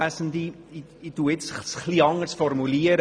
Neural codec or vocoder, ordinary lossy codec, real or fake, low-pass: none; none; real; 9.9 kHz